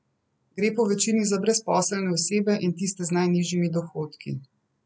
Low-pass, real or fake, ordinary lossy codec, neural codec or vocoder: none; real; none; none